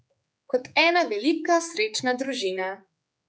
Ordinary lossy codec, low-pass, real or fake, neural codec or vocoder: none; none; fake; codec, 16 kHz, 4 kbps, X-Codec, HuBERT features, trained on general audio